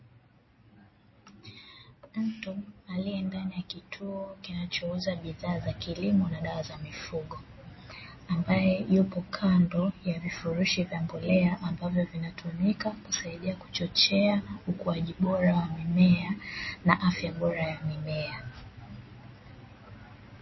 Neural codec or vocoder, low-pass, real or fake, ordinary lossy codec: none; 7.2 kHz; real; MP3, 24 kbps